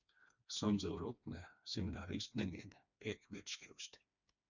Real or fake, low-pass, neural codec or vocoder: fake; 7.2 kHz; codec, 16 kHz, 2 kbps, FreqCodec, smaller model